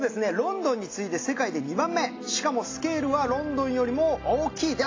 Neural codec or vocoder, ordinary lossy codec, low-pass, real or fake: none; AAC, 32 kbps; 7.2 kHz; real